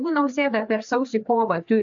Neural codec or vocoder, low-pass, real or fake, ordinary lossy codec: codec, 16 kHz, 4 kbps, FreqCodec, larger model; 7.2 kHz; fake; AAC, 64 kbps